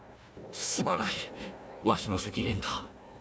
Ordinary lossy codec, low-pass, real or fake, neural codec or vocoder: none; none; fake; codec, 16 kHz, 1 kbps, FunCodec, trained on Chinese and English, 50 frames a second